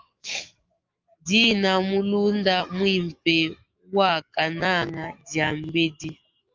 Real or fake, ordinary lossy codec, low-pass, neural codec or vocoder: fake; Opus, 32 kbps; 7.2 kHz; autoencoder, 48 kHz, 128 numbers a frame, DAC-VAE, trained on Japanese speech